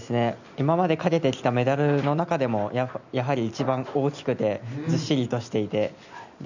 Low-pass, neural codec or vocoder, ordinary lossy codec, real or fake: 7.2 kHz; none; none; real